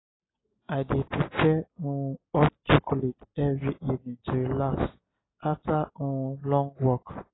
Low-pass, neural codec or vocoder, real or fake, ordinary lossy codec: 7.2 kHz; none; real; AAC, 16 kbps